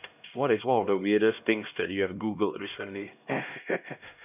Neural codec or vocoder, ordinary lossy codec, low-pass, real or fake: codec, 16 kHz, 1 kbps, X-Codec, HuBERT features, trained on LibriSpeech; none; 3.6 kHz; fake